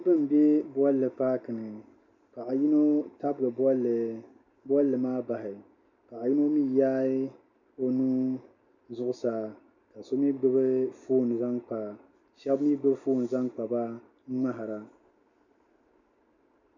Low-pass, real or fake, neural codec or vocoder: 7.2 kHz; real; none